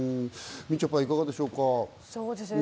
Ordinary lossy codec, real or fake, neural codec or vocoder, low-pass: none; real; none; none